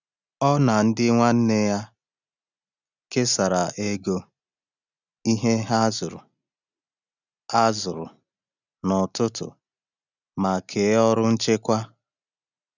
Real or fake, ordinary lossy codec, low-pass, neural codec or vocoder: real; none; 7.2 kHz; none